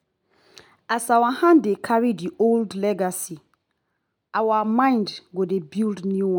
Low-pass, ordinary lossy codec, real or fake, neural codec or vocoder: none; none; real; none